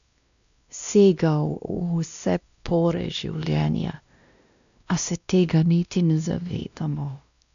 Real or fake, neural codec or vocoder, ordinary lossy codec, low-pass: fake; codec, 16 kHz, 1 kbps, X-Codec, WavLM features, trained on Multilingual LibriSpeech; none; 7.2 kHz